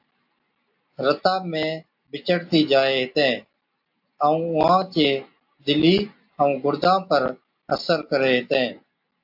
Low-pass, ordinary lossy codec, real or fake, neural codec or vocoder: 5.4 kHz; AAC, 48 kbps; real; none